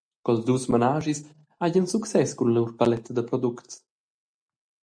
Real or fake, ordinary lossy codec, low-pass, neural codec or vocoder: real; MP3, 96 kbps; 9.9 kHz; none